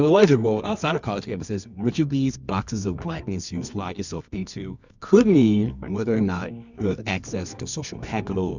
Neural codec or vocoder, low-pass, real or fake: codec, 24 kHz, 0.9 kbps, WavTokenizer, medium music audio release; 7.2 kHz; fake